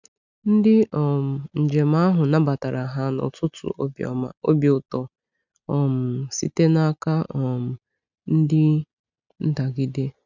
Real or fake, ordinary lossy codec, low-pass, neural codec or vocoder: real; none; 7.2 kHz; none